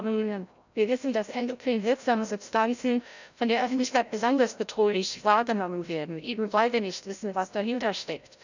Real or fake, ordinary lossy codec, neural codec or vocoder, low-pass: fake; none; codec, 16 kHz, 0.5 kbps, FreqCodec, larger model; 7.2 kHz